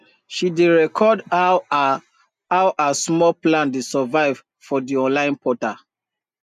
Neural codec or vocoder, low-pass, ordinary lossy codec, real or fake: none; 14.4 kHz; none; real